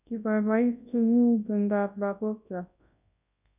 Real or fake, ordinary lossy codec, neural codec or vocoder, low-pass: fake; none; codec, 24 kHz, 0.9 kbps, WavTokenizer, large speech release; 3.6 kHz